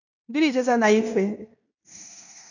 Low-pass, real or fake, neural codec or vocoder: 7.2 kHz; fake; codec, 16 kHz in and 24 kHz out, 0.9 kbps, LongCat-Audio-Codec, fine tuned four codebook decoder